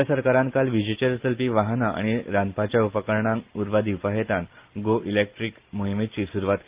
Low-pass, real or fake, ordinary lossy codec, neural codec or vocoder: 3.6 kHz; real; Opus, 32 kbps; none